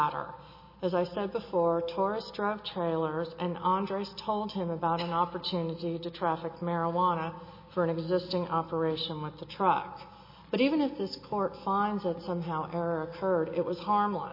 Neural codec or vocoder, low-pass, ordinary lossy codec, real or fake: none; 5.4 kHz; MP3, 24 kbps; real